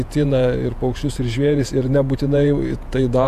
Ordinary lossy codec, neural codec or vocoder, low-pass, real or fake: AAC, 96 kbps; vocoder, 48 kHz, 128 mel bands, Vocos; 14.4 kHz; fake